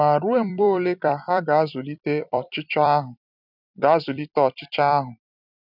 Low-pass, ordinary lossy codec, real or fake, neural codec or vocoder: 5.4 kHz; none; fake; vocoder, 44.1 kHz, 128 mel bands every 256 samples, BigVGAN v2